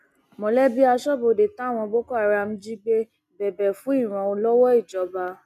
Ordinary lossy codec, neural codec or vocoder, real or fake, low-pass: none; none; real; 14.4 kHz